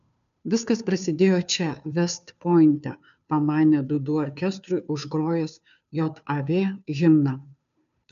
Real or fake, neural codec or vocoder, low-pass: fake; codec, 16 kHz, 2 kbps, FunCodec, trained on Chinese and English, 25 frames a second; 7.2 kHz